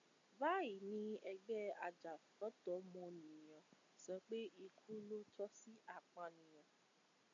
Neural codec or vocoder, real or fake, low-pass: none; real; 7.2 kHz